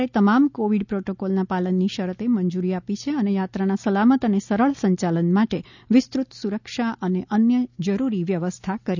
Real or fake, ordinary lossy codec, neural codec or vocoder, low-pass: real; none; none; 7.2 kHz